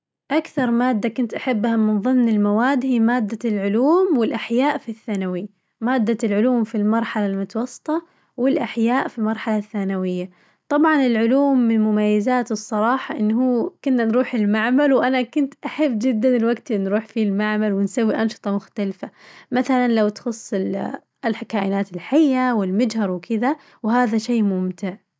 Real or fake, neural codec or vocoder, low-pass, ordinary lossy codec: real; none; none; none